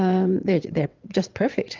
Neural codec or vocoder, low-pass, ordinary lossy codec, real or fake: vocoder, 22.05 kHz, 80 mel bands, WaveNeXt; 7.2 kHz; Opus, 24 kbps; fake